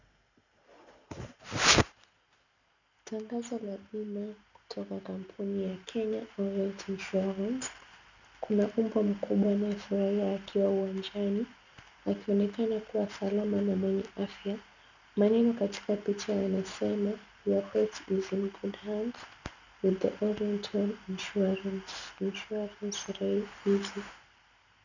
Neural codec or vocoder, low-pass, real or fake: none; 7.2 kHz; real